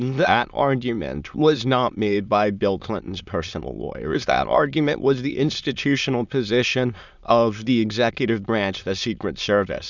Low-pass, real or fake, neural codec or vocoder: 7.2 kHz; fake; autoencoder, 22.05 kHz, a latent of 192 numbers a frame, VITS, trained on many speakers